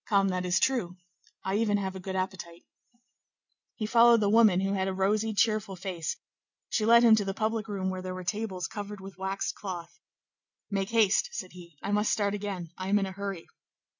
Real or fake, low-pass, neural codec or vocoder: real; 7.2 kHz; none